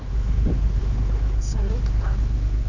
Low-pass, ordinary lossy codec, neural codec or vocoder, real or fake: 7.2 kHz; none; codec, 16 kHz, 4 kbps, X-Codec, HuBERT features, trained on general audio; fake